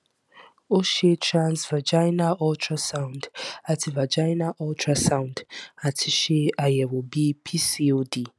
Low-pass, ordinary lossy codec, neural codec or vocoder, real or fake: none; none; none; real